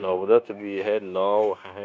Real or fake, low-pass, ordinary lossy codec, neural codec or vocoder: fake; none; none; codec, 16 kHz, 0.9 kbps, LongCat-Audio-Codec